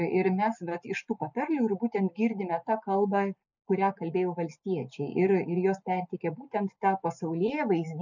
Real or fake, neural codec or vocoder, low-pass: real; none; 7.2 kHz